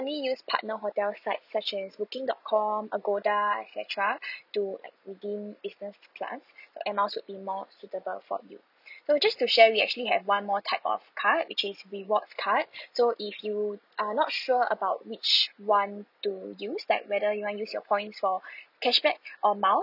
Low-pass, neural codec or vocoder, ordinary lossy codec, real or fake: 5.4 kHz; none; none; real